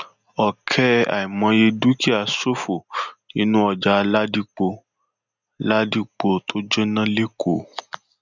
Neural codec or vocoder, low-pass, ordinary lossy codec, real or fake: none; 7.2 kHz; none; real